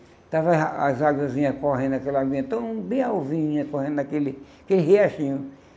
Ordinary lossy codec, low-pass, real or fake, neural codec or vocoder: none; none; real; none